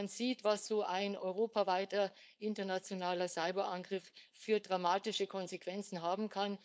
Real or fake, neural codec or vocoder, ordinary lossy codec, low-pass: fake; codec, 16 kHz, 4.8 kbps, FACodec; none; none